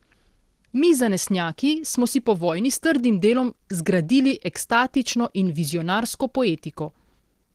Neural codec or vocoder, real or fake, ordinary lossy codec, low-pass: none; real; Opus, 16 kbps; 14.4 kHz